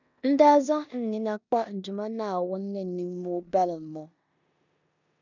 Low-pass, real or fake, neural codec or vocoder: 7.2 kHz; fake; codec, 16 kHz in and 24 kHz out, 0.9 kbps, LongCat-Audio-Codec, four codebook decoder